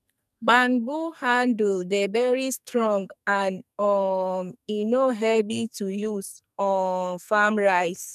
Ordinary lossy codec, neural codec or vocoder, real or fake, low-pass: none; codec, 44.1 kHz, 2.6 kbps, SNAC; fake; 14.4 kHz